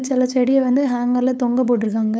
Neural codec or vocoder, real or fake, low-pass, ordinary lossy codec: codec, 16 kHz, 8 kbps, FunCodec, trained on LibriTTS, 25 frames a second; fake; none; none